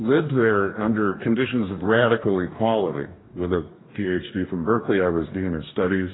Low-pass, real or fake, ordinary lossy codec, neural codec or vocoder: 7.2 kHz; fake; AAC, 16 kbps; codec, 44.1 kHz, 2.6 kbps, DAC